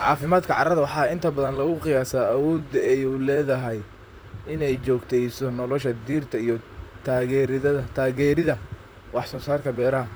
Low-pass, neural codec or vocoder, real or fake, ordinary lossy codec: none; vocoder, 44.1 kHz, 128 mel bands, Pupu-Vocoder; fake; none